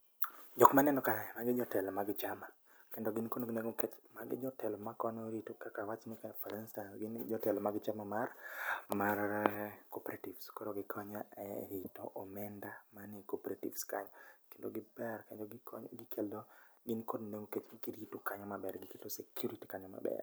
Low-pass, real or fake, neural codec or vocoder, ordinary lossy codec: none; real; none; none